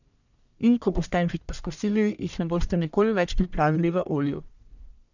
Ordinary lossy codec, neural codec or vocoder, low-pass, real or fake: none; codec, 44.1 kHz, 1.7 kbps, Pupu-Codec; 7.2 kHz; fake